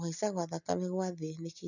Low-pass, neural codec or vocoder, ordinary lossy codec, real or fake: 7.2 kHz; none; none; real